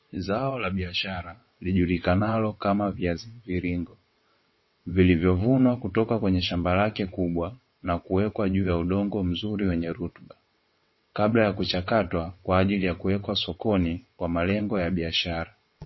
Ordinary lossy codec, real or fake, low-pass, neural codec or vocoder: MP3, 24 kbps; fake; 7.2 kHz; vocoder, 22.05 kHz, 80 mel bands, WaveNeXt